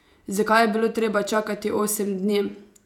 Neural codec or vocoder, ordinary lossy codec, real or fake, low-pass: vocoder, 48 kHz, 128 mel bands, Vocos; none; fake; 19.8 kHz